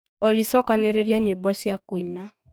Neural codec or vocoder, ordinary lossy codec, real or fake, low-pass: codec, 44.1 kHz, 2.6 kbps, DAC; none; fake; none